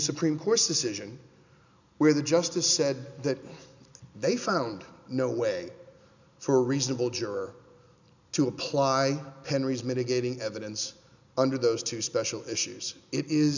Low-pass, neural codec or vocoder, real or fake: 7.2 kHz; none; real